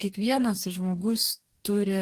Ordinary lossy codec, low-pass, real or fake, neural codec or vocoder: Opus, 24 kbps; 14.4 kHz; fake; codec, 44.1 kHz, 2.6 kbps, DAC